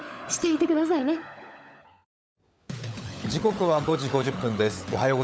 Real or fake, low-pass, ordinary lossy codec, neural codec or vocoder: fake; none; none; codec, 16 kHz, 16 kbps, FunCodec, trained on LibriTTS, 50 frames a second